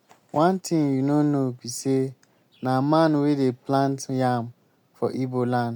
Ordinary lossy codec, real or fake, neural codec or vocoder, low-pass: MP3, 96 kbps; real; none; 19.8 kHz